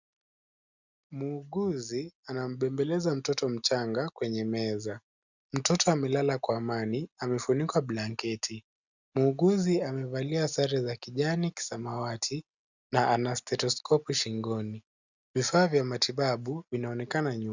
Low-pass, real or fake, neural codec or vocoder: 7.2 kHz; real; none